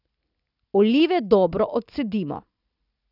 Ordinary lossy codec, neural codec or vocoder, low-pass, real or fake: none; none; 5.4 kHz; real